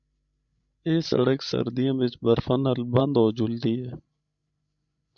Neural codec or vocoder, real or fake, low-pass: codec, 16 kHz, 16 kbps, FreqCodec, larger model; fake; 7.2 kHz